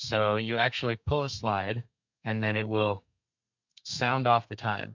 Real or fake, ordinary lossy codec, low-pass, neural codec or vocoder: fake; MP3, 64 kbps; 7.2 kHz; codec, 32 kHz, 1.9 kbps, SNAC